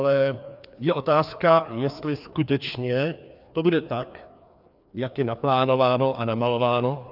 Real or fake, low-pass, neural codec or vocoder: fake; 5.4 kHz; codec, 16 kHz, 2 kbps, FreqCodec, larger model